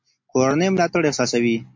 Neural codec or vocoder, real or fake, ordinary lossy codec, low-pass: none; real; MP3, 48 kbps; 7.2 kHz